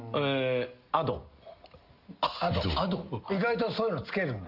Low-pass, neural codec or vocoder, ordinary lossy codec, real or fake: 5.4 kHz; none; Opus, 32 kbps; real